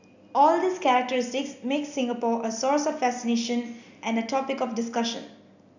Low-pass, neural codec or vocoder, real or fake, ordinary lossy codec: 7.2 kHz; none; real; none